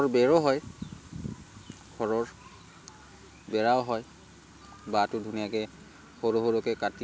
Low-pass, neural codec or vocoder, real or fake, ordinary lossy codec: none; none; real; none